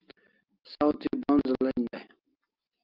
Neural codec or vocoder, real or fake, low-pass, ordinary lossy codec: none; real; 5.4 kHz; Opus, 24 kbps